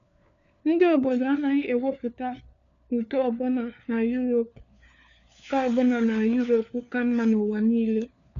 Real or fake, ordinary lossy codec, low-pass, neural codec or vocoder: fake; none; 7.2 kHz; codec, 16 kHz, 4 kbps, FunCodec, trained on LibriTTS, 50 frames a second